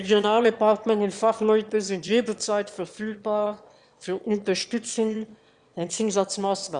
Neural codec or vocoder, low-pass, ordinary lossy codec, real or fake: autoencoder, 22.05 kHz, a latent of 192 numbers a frame, VITS, trained on one speaker; 9.9 kHz; Opus, 64 kbps; fake